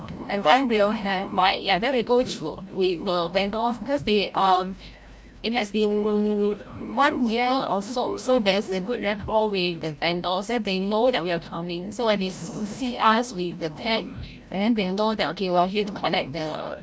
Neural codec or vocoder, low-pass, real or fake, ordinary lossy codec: codec, 16 kHz, 0.5 kbps, FreqCodec, larger model; none; fake; none